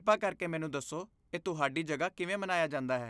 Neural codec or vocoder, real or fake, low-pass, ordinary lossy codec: none; real; none; none